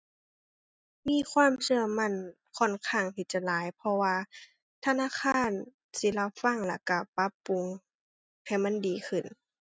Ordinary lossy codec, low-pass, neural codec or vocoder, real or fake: none; none; none; real